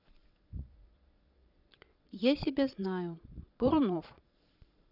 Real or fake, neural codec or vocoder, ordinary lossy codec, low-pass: fake; vocoder, 22.05 kHz, 80 mel bands, Vocos; none; 5.4 kHz